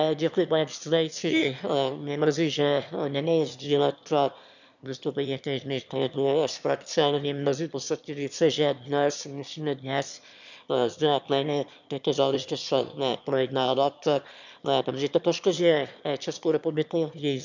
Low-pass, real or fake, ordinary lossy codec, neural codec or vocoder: 7.2 kHz; fake; none; autoencoder, 22.05 kHz, a latent of 192 numbers a frame, VITS, trained on one speaker